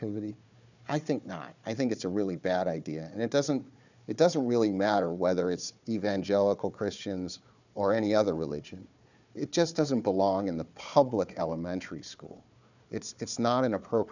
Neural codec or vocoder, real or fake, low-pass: codec, 16 kHz, 4 kbps, FunCodec, trained on Chinese and English, 50 frames a second; fake; 7.2 kHz